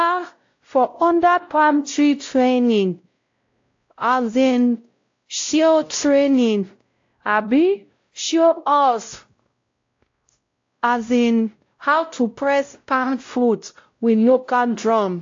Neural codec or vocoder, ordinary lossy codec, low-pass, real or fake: codec, 16 kHz, 0.5 kbps, X-Codec, WavLM features, trained on Multilingual LibriSpeech; AAC, 48 kbps; 7.2 kHz; fake